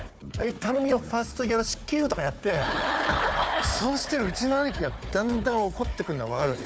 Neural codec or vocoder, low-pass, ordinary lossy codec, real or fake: codec, 16 kHz, 4 kbps, FunCodec, trained on Chinese and English, 50 frames a second; none; none; fake